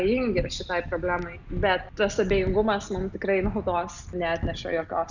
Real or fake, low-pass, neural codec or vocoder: real; 7.2 kHz; none